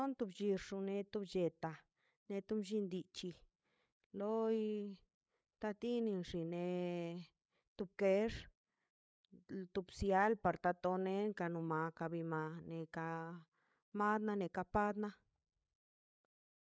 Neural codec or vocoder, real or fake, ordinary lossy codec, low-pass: codec, 16 kHz, 8 kbps, FreqCodec, larger model; fake; none; none